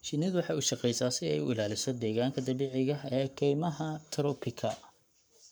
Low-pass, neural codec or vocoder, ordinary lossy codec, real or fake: none; codec, 44.1 kHz, 7.8 kbps, Pupu-Codec; none; fake